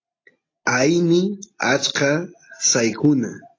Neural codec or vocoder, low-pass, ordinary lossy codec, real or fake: none; 7.2 kHz; AAC, 32 kbps; real